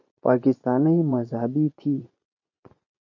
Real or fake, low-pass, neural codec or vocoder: fake; 7.2 kHz; vocoder, 24 kHz, 100 mel bands, Vocos